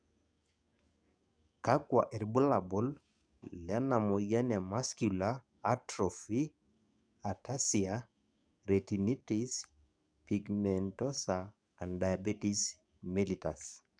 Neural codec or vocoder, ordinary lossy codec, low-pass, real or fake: codec, 44.1 kHz, 7.8 kbps, DAC; none; 9.9 kHz; fake